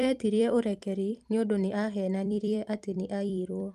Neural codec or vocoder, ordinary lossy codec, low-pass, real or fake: vocoder, 44.1 kHz, 128 mel bands every 256 samples, BigVGAN v2; Opus, 32 kbps; 14.4 kHz; fake